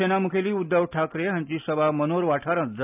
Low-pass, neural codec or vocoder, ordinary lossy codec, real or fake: 3.6 kHz; none; AAC, 32 kbps; real